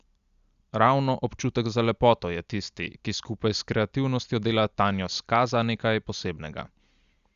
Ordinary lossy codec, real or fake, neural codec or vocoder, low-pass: Opus, 64 kbps; real; none; 7.2 kHz